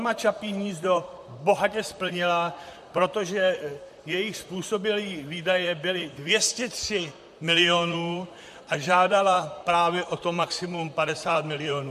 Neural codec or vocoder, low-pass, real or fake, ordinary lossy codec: vocoder, 44.1 kHz, 128 mel bands, Pupu-Vocoder; 14.4 kHz; fake; MP3, 64 kbps